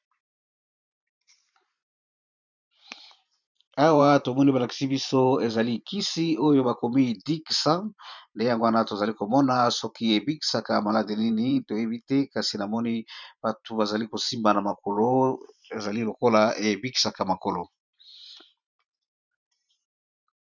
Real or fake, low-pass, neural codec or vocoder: fake; 7.2 kHz; vocoder, 44.1 kHz, 128 mel bands every 512 samples, BigVGAN v2